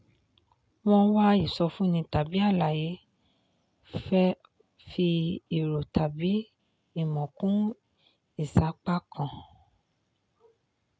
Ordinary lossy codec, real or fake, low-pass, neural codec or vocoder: none; real; none; none